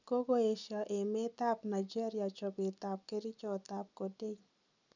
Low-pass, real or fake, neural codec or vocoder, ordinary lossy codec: 7.2 kHz; real; none; none